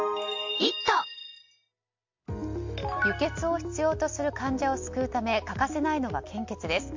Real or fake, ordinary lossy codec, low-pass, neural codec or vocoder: real; MP3, 48 kbps; 7.2 kHz; none